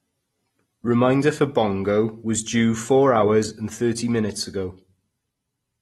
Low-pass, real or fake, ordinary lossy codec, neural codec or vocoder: 19.8 kHz; real; AAC, 32 kbps; none